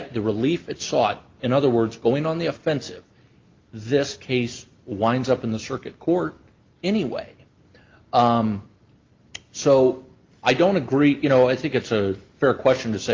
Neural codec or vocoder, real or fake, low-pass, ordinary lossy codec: none; real; 7.2 kHz; Opus, 32 kbps